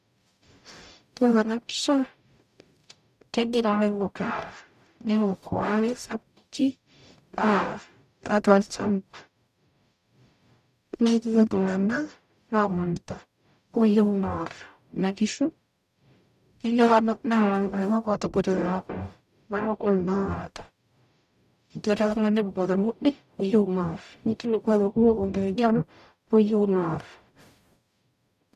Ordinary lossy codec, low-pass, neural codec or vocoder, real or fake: none; 14.4 kHz; codec, 44.1 kHz, 0.9 kbps, DAC; fake